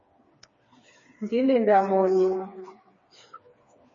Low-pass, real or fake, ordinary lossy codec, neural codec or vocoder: 7.2 kHz; fake; MP3, 32 kbps; codec, 16 kHz, 4 kbps, FreqCodec, smaller model